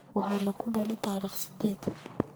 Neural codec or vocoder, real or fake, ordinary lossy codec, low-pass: codec, 44.1 kHz, 1.7 kbps, Pupu-Codec; fake; none; none